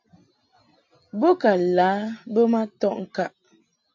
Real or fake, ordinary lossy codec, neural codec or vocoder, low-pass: real; AAC, 48 kbps; none; 7.2 kHz